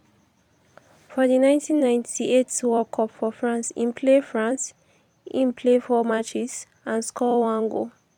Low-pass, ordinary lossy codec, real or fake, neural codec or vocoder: 19.8 kHz; none; fake; vocoder, 44.1 kHz, 128 mel bands every 256 samples, BigVGAN v2